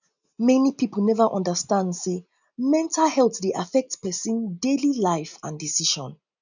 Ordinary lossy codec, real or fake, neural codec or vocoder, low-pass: none; real; none; 7.2 kHz